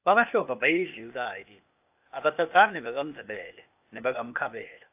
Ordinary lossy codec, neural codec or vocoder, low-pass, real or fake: AAC, 32 kbps; codec, 16 kHz, 0.8 kbps, ZipCodec; 3.6 kHz; fake